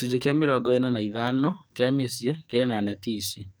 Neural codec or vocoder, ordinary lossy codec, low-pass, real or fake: codec, 44.1 kHz, 2.6 kbps, SNAC; none; none; fake